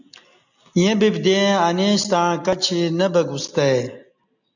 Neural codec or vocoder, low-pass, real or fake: none; 7.2 kHz; real